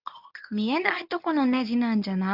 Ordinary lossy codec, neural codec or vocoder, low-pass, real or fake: none; codec, 24 kHz, 0.9 kbps, WavTokenizer, medium speech release version 1; 5.4 kHz; fake